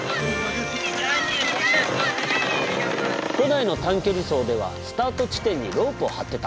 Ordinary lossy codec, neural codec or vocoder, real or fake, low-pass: none; none; real; none